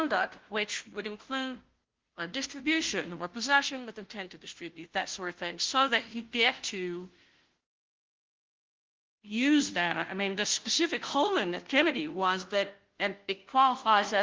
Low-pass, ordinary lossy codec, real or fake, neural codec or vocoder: 7.2 kHz; Opus, 16 kbps; fake; codec, 16 kHz, 0.5 kbps, FunCodec, trained on Chinese and English, 25 frames a second